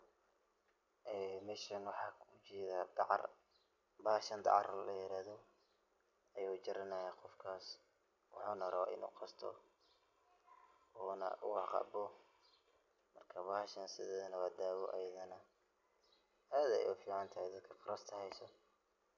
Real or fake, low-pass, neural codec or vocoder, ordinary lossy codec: real; 7.2 kHz; none; none